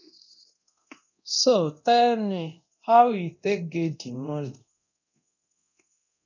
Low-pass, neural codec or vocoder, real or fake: 7.2 kHz; codec, 24 kHz, 0.9 kbps, DualCodec; fake